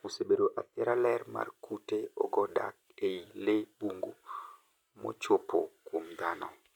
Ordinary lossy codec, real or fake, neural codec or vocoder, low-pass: none; real; none; 19.8 kHz